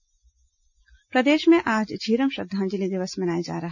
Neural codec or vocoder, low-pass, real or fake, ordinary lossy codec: none; 7.2 kHz; real; none